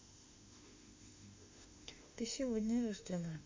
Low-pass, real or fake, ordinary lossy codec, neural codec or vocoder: 7.2 kHz; fake; MP3, 48 kbps; autoencoder, 48 kHz, 32 numbers a frame, DAC-VAE, trained on Japanese speech